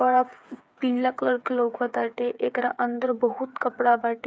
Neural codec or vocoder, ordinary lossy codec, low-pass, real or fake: codec, 16 kHz, 8 kbps, FreqCodec, smaller model; none; none; fake